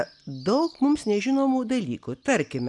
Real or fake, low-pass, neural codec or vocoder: real; 10.8 kHz; none